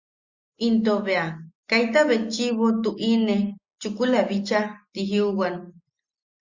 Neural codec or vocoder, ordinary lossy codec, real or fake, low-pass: none; Opus, 64 kbps; real; 7.2 kHz